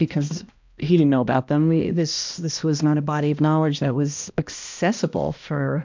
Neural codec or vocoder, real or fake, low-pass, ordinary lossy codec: codec, 16 kHz, 1 kbps, X-Codec, HuBERT features, trained on balanced general audio; fake; 7.2 kHz; MP3, 64 kbps